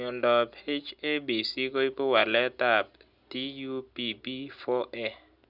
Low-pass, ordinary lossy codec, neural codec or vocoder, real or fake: 5.4 kHz; none; none; real